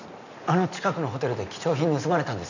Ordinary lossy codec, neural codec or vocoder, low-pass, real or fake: none; none; 7.2 kHz; real